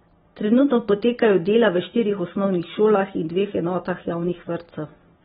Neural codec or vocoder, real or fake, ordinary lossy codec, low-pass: none; real; AAC, 16 kbps; 14.4 kHz